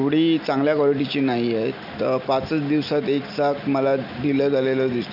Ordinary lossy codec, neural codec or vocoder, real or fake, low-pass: none; none; real; 5.4 kHz